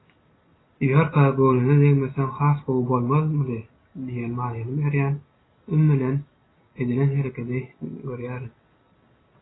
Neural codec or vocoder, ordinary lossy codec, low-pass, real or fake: none; AAC, 16 kbps; 7.2 kHz; real